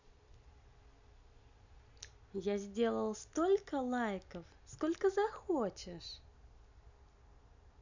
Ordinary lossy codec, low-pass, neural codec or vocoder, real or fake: none; 7.2 kHz; none; real